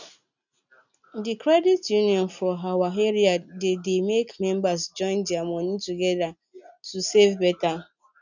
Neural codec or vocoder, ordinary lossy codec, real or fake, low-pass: none; none; real; 7.2 kHz